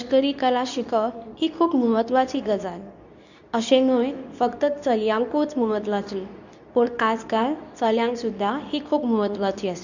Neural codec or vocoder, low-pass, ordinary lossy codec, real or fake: codec, 24 kHz, 0.9 kbps, WavTokenizer, medium speech release version 2; 7.2 kHz; none; fake